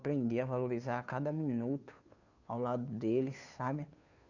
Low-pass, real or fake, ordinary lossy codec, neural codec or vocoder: 7.2 kHz; fake; none; codec, 16 kHz, 2 kbps, FunCodec, trained on Chinese and English, 25 frames a second